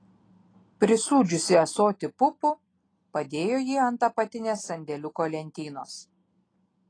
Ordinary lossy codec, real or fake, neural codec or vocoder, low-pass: AAC, 32 kbps; real; none; 9.9 kHz